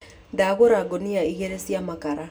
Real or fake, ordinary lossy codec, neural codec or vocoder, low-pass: real; none; none; none